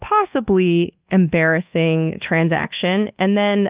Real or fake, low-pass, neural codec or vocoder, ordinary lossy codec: fake; 3.6 kHz; codec, 24 kHz, 1.2 kbps, DualCodec; Opus, 32 kbps